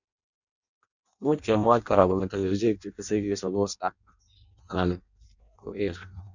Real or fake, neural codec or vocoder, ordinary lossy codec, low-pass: fake; codec, 16 kHz in and 24 kHz out, 0.6 kbps, FireRedTTS-2 codec; none; 7.2 kHz